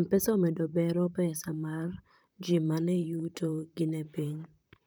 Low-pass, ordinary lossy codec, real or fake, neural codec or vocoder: none; none; fake; vocoder, 44.1 kHz, 128 mel bands, Pupu-Vocoder